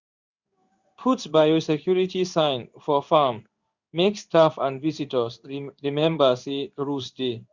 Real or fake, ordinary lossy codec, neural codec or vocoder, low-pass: fake; Opus, 64 kbps; codec, 16 kHz in and 24 kHz out, 1 kbps, XY-Tokenizer; 7.2 kHz